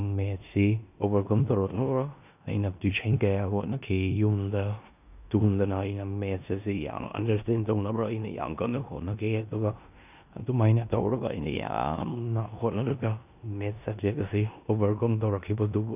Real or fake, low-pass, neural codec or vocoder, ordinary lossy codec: fake; 3.6 kHz; codec, 16 kHz in and 24 kHz out, 0.9 kbps, LongCat-Audio-Codec, four codebook decoder; none